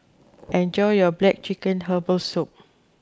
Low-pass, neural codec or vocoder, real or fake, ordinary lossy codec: none; none; real; none